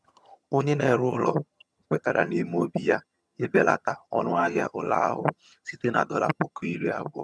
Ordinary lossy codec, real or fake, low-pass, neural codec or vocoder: none; fake; none; vocoder, 22.05 kHz, 80 mel bands, HiFi-GAN